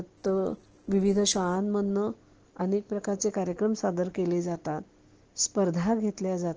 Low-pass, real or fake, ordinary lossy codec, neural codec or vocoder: 7.2 kHz; real; Opus, 16 kbps; none